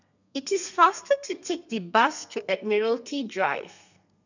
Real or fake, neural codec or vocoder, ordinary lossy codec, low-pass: fake; codec, 32 kHz, 1.9 kbps, SNAC; none; 7.2 kHz